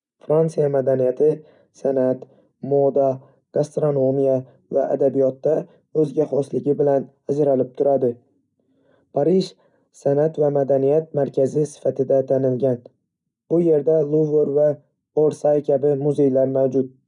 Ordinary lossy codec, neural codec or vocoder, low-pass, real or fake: none; none; 10.8 kHz; real